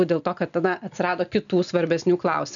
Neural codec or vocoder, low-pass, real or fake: none; 7.2 kHz; real